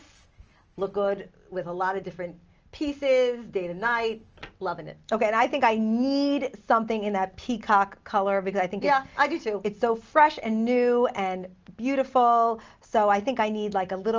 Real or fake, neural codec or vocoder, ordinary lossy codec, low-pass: real; none; Opus, 24 kbps; 7.2 kHz